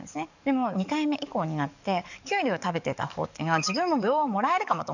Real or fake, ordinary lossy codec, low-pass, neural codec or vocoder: fake; none; 7.2 kHz; codec, 44.1 kHz, 7.8 kbps, DAC